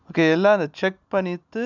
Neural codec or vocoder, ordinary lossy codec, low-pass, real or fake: none; none; 7.2 kHz; real